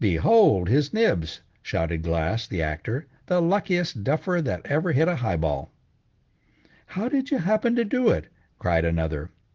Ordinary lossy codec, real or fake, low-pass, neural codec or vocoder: Opus, 16 kbps; real; 7.2 kHz; none